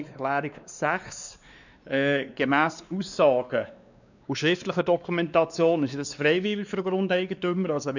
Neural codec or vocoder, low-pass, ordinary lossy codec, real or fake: codec, 16 kHz, 2 kbps, X-Codec, WavLM features, trained on Multilingual LibriSpeech; 7.2 kHz; none; fake